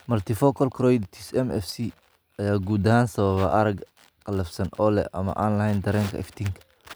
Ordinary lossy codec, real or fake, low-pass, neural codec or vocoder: none; real; none; none